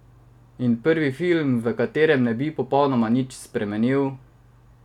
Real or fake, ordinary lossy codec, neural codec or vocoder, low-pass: real; none; none; 19.8 kHz